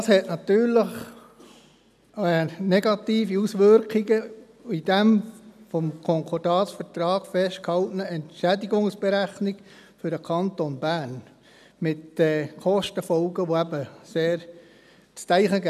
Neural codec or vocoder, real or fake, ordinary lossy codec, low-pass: none; real; none; 14.4 kHz